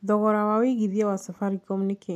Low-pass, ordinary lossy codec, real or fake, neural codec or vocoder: 14.4 kHz; MP3, 96 kbps; real; none